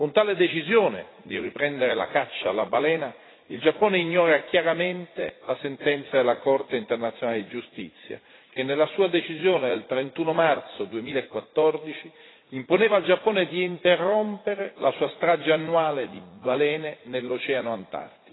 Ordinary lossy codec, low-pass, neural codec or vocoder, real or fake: AAC, 16 kbps; 7.2 kHz; vocoder, 44.1 kHz, 80 mel bands, Vocos; fake